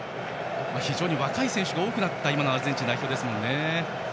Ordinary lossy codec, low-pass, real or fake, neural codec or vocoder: none; none; real; none